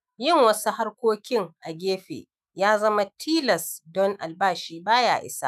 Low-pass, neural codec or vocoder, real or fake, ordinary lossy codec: 14.4 kHz; autoencoder, 48 kHz, 128 numbers a frame, DAC-VAE, trained on Japanese speech; fake; none